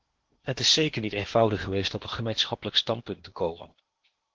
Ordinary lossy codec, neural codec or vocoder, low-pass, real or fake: Opus, 16 kbps; codec, 16 kHz in and 24 kHz out, 0.8 kbps, FocalCodec, streaming, 65536 codes; 7.2 kHz; fake